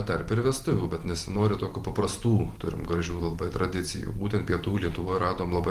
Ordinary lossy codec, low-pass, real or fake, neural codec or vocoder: Opus, 24 kbps; 14.4 kHz; fake; vocoder, 44.1 kHz, 128 mel bands every 512 samples, BigVGAN v2